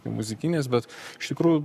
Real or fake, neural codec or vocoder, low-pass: fake; codec, 44.1 kHz, 7.8 kbps, Pupu-Codec; 14.4 kHz